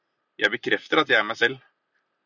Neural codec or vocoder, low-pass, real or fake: none; 7.2 kHz; real